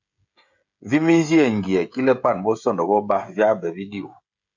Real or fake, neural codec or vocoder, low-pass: fake; codec, 16 kHz, 16 kbps, FreqCodec, smaller model; 7.2 kHz